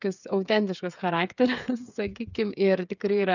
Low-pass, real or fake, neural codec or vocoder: 7.2 kHz; fake; codec, 16 kHz, 8 kbps, FreqCodec, smaller model